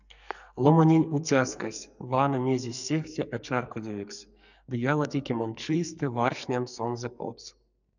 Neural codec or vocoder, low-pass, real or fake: codec, 44.1 kHz, 2.6 kbps, SNAC; 7.2 kHz; fake